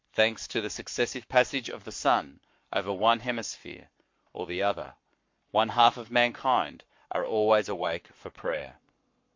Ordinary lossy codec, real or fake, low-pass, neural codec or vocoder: MP3, 48 kbps; fake; 7.2 kHz; codec, 44.1 kHz, 7.8 kbps, Pupu-Codec